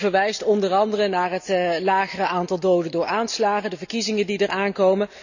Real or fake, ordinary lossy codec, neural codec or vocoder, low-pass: real; none; none; 7.2 kHz